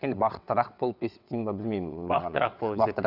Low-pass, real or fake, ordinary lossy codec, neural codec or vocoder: 5.4 kHz; fake; Opus, 64 kbps; vocoder, 22.05 kHz, 80 mel bands, WaveNeXt